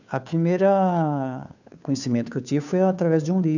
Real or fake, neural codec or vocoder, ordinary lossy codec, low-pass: fake; codec, 16 kHz, 2 kbps, FunCodec, trained on Chinese and English, 25 frames a second; none; 7.2 kHz